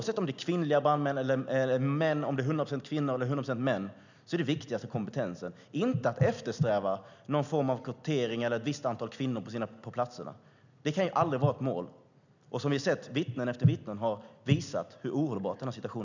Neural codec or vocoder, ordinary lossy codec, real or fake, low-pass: none; none; real; 7.2 kHz